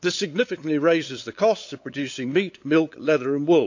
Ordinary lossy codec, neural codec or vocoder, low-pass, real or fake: AAC, 48 kbps; codec, 16 kHz, 8 kbps, FunCodec, trained on LibriTTS, 25 frames a second; 7.2 kHz; fake